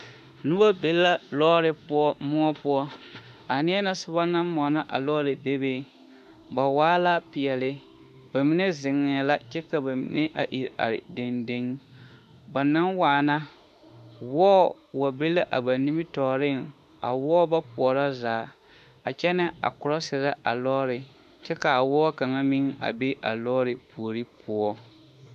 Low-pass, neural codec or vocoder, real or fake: 14.4 kHz; autoencoder, 48 kHz, 32 numbers a frame, DAC-VAE, trained on Japanese speech; fake